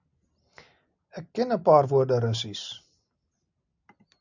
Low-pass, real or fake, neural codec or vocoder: 7.2 kHz; real; none